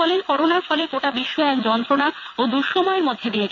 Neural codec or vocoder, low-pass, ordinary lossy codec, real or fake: vocoder, 22.05 kHz, 80 mel bands, WaveNeXt; 7.2 kHz; none; fake